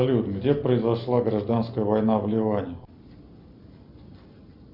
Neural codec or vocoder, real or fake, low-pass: none; real; 5.4 kHz